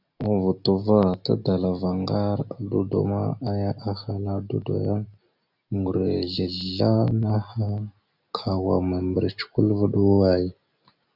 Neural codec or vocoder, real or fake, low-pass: none; real; 5.4 kHz